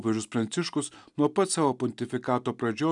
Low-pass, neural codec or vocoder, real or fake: 10.8 kHz; none; real